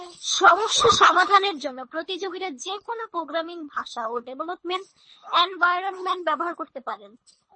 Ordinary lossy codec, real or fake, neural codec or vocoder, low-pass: MP3, 32 kbps; fake; codec, 24 kHz, 3 kbps, HILCodec; 9.9 kHz